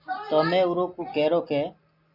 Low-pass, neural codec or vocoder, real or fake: 5.4 kHz; none; real